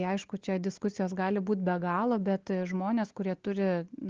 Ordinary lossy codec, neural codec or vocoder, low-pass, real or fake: Opus, 16 kbps; none; 7.2 kHz; real